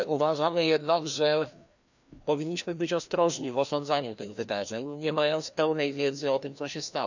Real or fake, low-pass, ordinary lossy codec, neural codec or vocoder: fake; 7.2 kHz; none; codec, 16 kHz, 1 kbps, FreqCodec, larger model